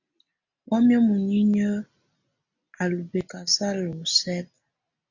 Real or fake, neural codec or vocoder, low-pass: real; none; 7.2 kHz